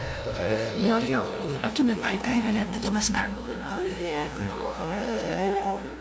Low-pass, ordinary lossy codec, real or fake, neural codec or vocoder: none; none; fake; codec, 16 kHz, 0.5 kbps, FunCodec, trained on LibriTTS, 25 frames a second